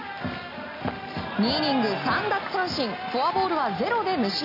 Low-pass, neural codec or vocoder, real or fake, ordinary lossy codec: 5.4 kHz; none; real; AAC, 48 kbps